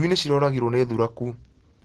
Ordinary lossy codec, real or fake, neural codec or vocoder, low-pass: Opus, 16 kbps; real; none; 10.8 kHz